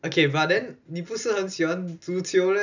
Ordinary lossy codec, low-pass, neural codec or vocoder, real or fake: none; 7.2 kHz; none; real